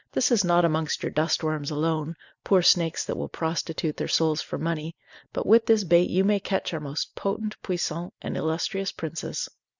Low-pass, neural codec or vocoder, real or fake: 7.2 kHz; none; real